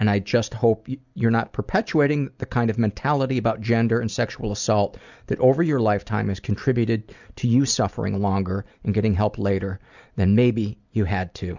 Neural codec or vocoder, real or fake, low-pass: none; real; 7.2 kHz